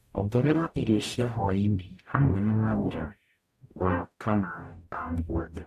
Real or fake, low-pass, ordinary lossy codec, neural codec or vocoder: fake; 14.4 kHz; AAC, 96 kbps; codec, 44.1 kHz, 0.9 kbps, DAC